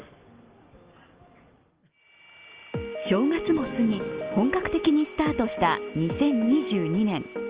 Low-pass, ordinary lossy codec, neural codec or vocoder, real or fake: 3.6 kHz; Opus, 24 kbps; none; real